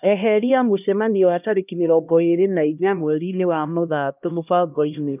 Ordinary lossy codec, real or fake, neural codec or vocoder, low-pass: none; fake; codec, 16 kHz, 1 kbps, X-Codec, HuBERT features, trained on LibriSpeech; 3.6 kHz